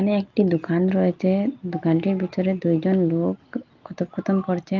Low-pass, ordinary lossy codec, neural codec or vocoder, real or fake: 7.2 kHz; Opus, 24 kbps; none; real